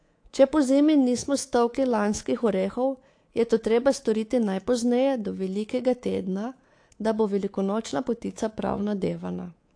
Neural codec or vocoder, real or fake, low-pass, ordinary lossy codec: codec, 24 kHz, 3.1 kbps, DualCodec; fake; 9.9 kHz; AAC, 48 kbps